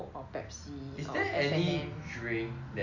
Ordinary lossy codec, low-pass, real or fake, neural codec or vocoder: none; 7.2 kHz; real; none